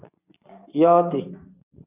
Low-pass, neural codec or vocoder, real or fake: 3.6 kHz; vocoder, 24 kHz, 100 mel bands, Vocos; fake